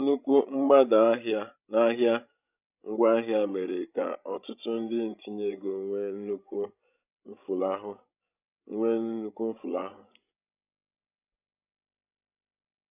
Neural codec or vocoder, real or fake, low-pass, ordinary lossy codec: codec, 16 kHz, 16 kbps, FreqCodec, larger model; fake; 3.6 kHz; none